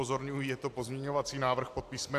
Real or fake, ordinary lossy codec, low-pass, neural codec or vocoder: real; AAC, 64 kbps; 14.4 kHz; none